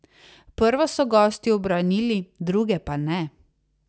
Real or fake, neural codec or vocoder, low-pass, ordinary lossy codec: real; none; none; none